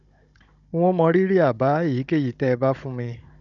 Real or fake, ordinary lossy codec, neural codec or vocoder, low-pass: fake; none; codec, 16 kHz, 16 kbps, FunCodec, trained on Chinese and English, 50 frames a second; 7.2 kHz